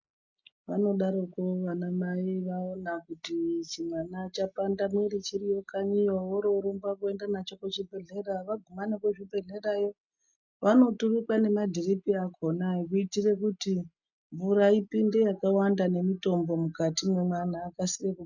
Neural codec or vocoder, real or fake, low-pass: none; real; 7.2 kHz